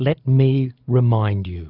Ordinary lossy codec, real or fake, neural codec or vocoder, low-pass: Opus, 64 kbps; real; none; 5.4 kHz